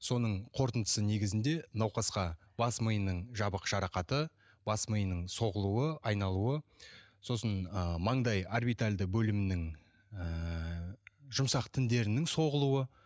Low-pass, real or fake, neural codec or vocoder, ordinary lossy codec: none; real; none; none